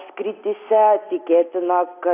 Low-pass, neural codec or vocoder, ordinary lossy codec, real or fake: 3.6 kHz; none; AAC, 24 kbps; real